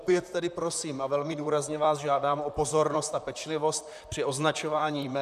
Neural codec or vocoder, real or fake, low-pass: vocoder, 44.1 kHz, 128 mel bands, Pupu-Vocoder; fake; 14.4 kHz